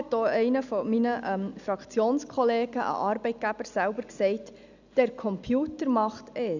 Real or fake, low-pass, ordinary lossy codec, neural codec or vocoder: real; 7.2 kHz; none; none